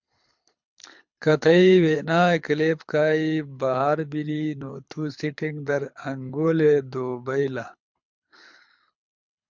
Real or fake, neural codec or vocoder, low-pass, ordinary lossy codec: fake; codec, 24 kHz, 6 kbps, HILCodec; 7.2 kHz; MP3, 64 kbps